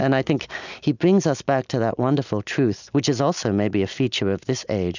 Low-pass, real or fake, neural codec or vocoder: 7.2 kHz; real; none